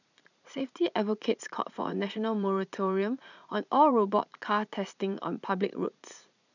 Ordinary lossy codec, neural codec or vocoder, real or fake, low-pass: none; vocoder, 44.1 kHz, 80 mel bands, Vocos; fake; 7.2 kHz